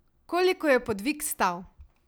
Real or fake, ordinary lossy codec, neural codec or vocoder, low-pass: real; none; none; none